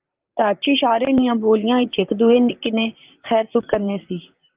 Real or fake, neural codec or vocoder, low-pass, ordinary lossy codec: fake; vocoder, 44.1 kHz, 128 mel bands, Pupu-Vocoder; 3.6 kHz; Opus, 32 kbps